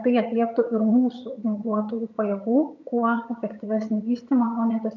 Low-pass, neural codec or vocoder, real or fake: 7.2 kHz; vocoder, 22.05 kHz, 80 mel bands, HiFi-GAN; fake